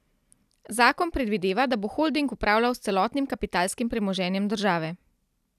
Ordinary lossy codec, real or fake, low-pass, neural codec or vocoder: none; real; 14.4 kHz; none